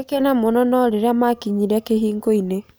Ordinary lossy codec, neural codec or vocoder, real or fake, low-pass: none; none; real; none